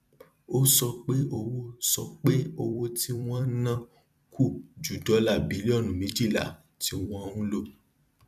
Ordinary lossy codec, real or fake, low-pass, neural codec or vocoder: none; fake; 14.4 kHz; vocoder, 44.1 kHz, 128 mel bands every 256 samples, BigVGAN v2